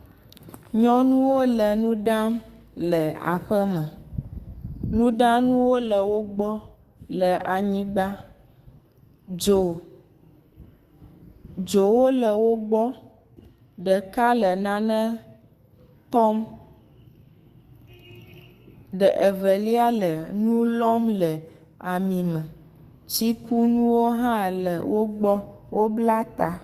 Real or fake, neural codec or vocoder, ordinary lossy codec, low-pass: fake; codec, 44.1 kHz, 2.6 kbps, SNAC; Opus, 64 kbps; 14.4 kHz